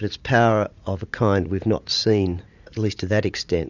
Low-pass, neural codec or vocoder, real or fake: 7.2 kHz; vocoder, 44.1 kHz, 80 mel bands, Vocos; fake